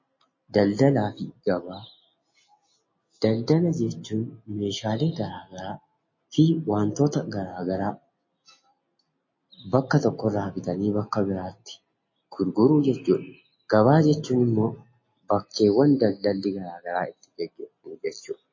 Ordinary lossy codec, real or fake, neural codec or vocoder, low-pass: MP3, 32 kbps; real; none; 7.2 kHz